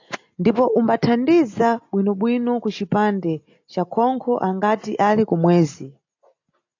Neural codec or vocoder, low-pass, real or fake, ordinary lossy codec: none; 7.2 kHz; real; AAC, 48 kbps